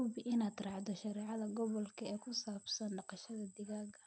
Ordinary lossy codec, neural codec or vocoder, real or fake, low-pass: none; none; real; none